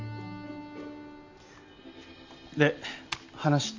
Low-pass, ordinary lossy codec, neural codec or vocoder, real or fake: 7.2 kHz; AAC, 48 kbps; none; real